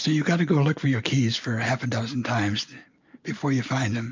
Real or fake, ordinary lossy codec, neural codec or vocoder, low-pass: real; MP3, 48 kbps; none; 7.2 kHz